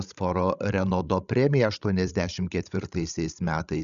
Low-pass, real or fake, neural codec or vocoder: 7.2 kHz; fake; codec, 16 kHz, 16 kbps, FreqCodec, larger model